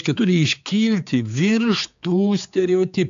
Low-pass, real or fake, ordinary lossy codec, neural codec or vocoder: 7.2 kHz; fake; MP3, 64 kbps; codec, 16 kHz, 4 kbps, X-Codec, HuBERT features, trained on general audio